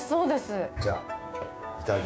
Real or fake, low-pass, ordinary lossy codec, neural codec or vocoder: fake; none; none; codec, 16 kHz, 6 kbps, DAC